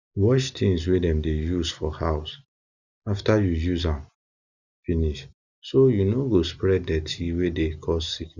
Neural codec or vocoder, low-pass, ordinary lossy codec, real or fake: none; 7.2 kHz; none; real